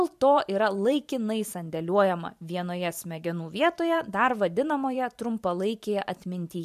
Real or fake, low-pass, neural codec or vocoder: fake; 14.4 kHz; vocoder, 44.1 kHz, 128 mel bands every 512 samples, BigVGAN v2